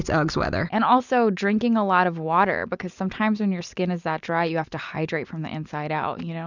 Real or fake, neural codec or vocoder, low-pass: real; none; 7.2 kHz